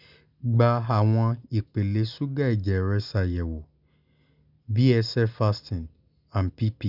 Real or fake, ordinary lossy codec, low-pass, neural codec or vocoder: real; none; 5.4 kHz; none